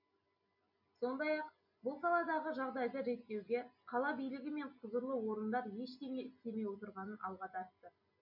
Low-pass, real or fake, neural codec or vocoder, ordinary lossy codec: 5.4 kHz; real; none; none